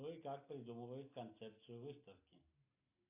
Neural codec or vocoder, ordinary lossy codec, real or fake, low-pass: none; AAC, 24 kbps; real; 3.6 kHz